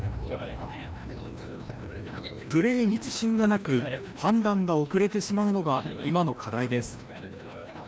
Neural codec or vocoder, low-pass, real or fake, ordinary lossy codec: codec, 16 kHz, 1 kbps, FreqCodec, larger model; none; fake; none